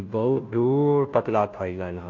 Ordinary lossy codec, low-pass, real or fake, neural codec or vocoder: MP3, 48 kbps; 7.2 kHz; fake; codec, 16 kHz, 0.5 kbps, FunCodec, trained on Chinese and English, 25 frames a second